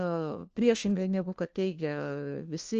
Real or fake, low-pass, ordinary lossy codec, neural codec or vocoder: fake; 7.2 kHz; Opus, 24 kbps; codec, 16 kHz, 1 kbps, FunCodec, trained on LibriTTS, 50 frames a second